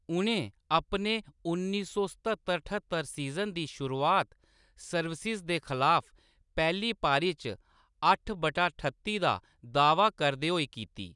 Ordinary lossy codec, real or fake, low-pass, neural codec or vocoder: none; real; 10.8 kHz; none